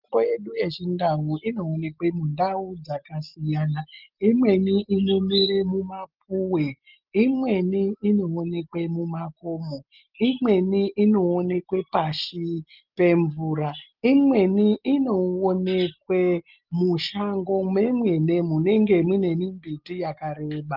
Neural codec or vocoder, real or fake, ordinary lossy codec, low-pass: none; real; Opus, 32 kbps; 5.4 kHz